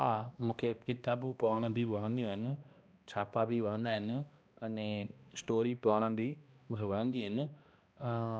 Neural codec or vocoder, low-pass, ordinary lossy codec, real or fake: codec, 16 kHz, 1 kbps, X-Codec, HuBERT features, trained on balanced general audio; none; none; fake